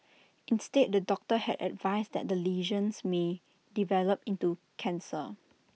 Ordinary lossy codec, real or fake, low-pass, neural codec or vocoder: none; real; none; none